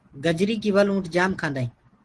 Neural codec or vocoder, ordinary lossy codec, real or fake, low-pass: none; Opus, 16 kbps; real; 10.8 kHz